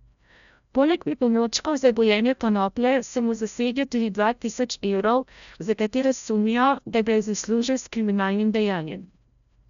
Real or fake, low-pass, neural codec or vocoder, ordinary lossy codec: fake; 7.2 kHz; codec, 16 kHz, 0.5 kbps, FreqCodec, larger model; none